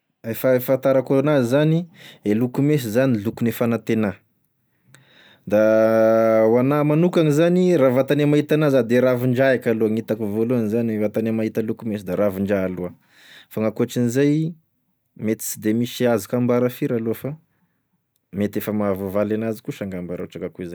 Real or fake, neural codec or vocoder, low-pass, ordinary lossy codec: real; none; none; none